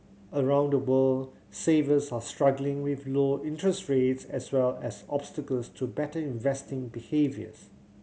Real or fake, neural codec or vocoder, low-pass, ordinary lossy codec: real; none; none; none